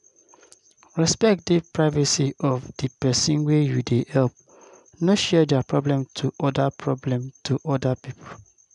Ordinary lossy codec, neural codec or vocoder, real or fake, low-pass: none; none; real; 10.8 kHz